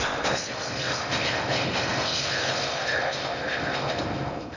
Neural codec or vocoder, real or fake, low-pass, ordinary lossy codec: codec, 16 kHz in and 24 kHz out, 0.6 kbps, FocalCodec, streaming, 2048 codes; fake; 7.2 kHz; Opus, 64 kbps